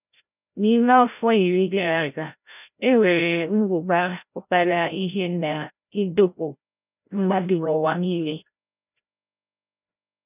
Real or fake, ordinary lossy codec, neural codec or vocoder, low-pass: fake; none; codec, 16 kHz, 0.5 kbps, FreqCodec, larger model; 3.6 kHz